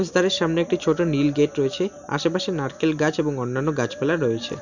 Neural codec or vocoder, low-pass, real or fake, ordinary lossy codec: none; 7.2 kHz; real; none